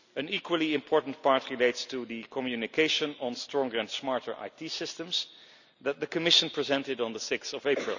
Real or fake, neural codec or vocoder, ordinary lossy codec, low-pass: real; none; none; 7.2 kHz